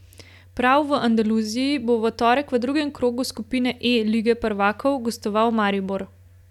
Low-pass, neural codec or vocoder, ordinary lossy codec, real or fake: 19.8 kHz; none; none; real